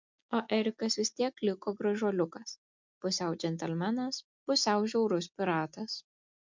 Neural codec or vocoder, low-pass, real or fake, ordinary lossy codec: none; 7.2 kHz; real; MP3, 64 kbps